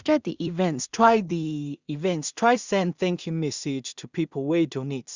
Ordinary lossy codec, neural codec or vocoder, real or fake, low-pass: Opus, 64 kbps; codec, 16 kHz in and 24 kHz out, 0.4 kbps, LongCat-Audio-Codec, two codebook decoder; fake; 7.2 kHz